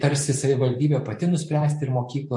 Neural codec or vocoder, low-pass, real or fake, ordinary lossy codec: vocoder, 44.1 kHz, 128 mel bands every 512 samples, BigVGAN v2; 10.8 kHz; fake; MP3, 48 kbps